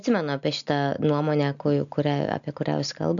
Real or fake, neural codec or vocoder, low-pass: real; none; 7.2 kHz